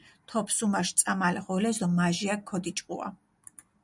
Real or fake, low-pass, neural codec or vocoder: real; 10.8 kHz; none